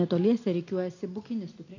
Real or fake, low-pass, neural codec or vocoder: real; 7.2 kHz; none